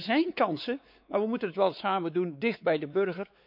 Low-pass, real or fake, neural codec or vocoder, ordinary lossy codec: 5.4 kHz; fake; codec, 16 kHz, 4 kbps, X-Codec, WavLM features, trained on Multilingual LibriSpeech; none